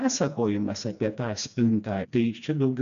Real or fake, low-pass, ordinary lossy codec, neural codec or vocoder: fake; 7.2 kHz; MP3, 96 kbps; codec, 16 kHz, 2 kbps, FreqCodec, smaller model